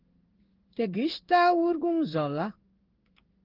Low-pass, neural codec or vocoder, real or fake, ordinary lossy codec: 5.4 kHz; codec, 16 kHz in and 24 kHz out, 1 kbps, XY-Tokenizer; fake; Opus, 16 kbps